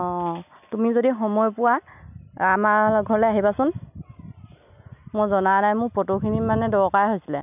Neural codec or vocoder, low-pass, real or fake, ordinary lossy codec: none; 3.6 kHz; real; none